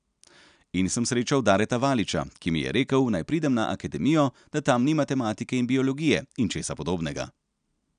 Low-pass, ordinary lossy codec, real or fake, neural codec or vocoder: 9.9 kHz; none; real; none